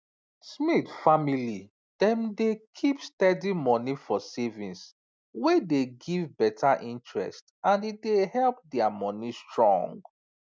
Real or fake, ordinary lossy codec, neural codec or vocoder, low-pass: real; none; none; none